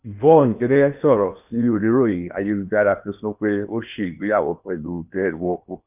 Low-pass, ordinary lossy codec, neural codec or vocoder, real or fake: 3.6 kHz; none; codec, 16 kHz in and 24 kHz out, 0.8 kbps, FocalCodec, streaming, 65536 codes; fake